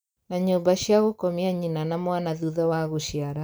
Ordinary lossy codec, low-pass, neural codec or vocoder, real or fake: none; none; none; real